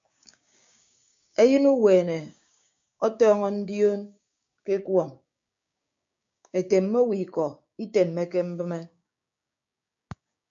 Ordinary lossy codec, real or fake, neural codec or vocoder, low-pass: MP3, 48 kbps; fake; codec, 16 kHz, 6 kbps, DAC; 7.2 kHz